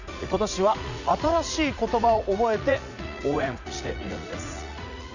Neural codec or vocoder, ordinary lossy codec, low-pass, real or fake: vocoder, 44.1 kHz, 80 mel bands, Vocos; none; 7.2 kHz; fake